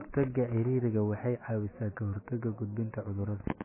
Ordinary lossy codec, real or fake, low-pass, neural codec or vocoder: MP3, 16 kbps; fake; 3.6 kHz; autoencoder, 48 kHz, 128 numbers a frame, DAC-VAE, trained on Japanese speech